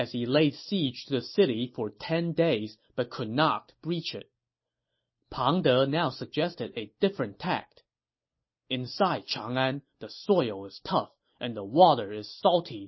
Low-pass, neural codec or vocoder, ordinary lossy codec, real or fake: 7.2 kHz; none; MP3, 24 kbps; real